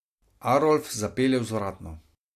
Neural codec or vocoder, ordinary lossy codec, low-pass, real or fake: none; AAC, 64 kbps; 14.4 kHz; real